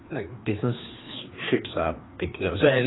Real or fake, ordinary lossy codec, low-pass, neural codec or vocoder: fake; AAC, 16 kbps; 7.2 kHz; codec, 16 kHz, 2 kbps, FreqCodec, larger model